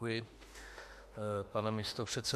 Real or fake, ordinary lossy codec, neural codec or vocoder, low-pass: fake; MP3, 64 kbps; autoencoder, 48 kHz, 32 numbers a frame, DAC-VAE, trained on Japanese speech; 14.4 kHz